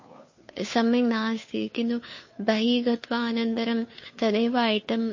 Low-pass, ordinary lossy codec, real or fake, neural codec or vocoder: 7.2 kHz; MP3, 32 kbps; fake; codec, 16 kHz, 2 kbps, FunCodec, trained on Chinese and English, 25 frames a second